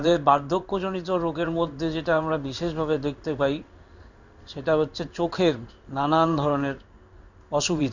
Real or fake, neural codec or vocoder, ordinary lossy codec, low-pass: fake; codec, 16 kHz in and 24 kHz out, 1 kbps, XY-Tokenizer; none; 7.2 kHz